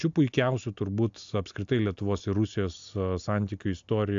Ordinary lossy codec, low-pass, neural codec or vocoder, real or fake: AAC, 64 kbps; 7.2 kHz; none; real